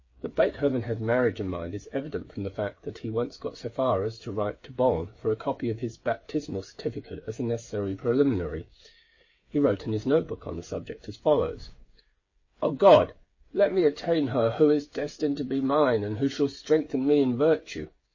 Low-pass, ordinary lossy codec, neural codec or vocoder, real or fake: 7.2 kHz; MP3, 32 kbps; codec, 16 kHz, 8 kbps, FreqCodec, smaller model; fake